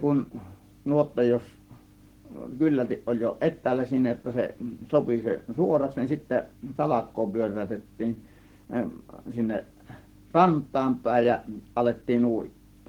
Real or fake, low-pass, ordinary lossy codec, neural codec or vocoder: fake; 19.8 kHz; Opus, 16 kbps; codec, 44.1 kHz, 7.8 kbps, Pupu-Codec